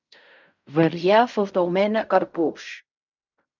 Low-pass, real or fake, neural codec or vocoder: 7.2 kHz; fake; codec, 16 kHz in and 24 kHz out, 0.4 kbps, LongCat-Audio-Codec, fine tuned four codebook decoder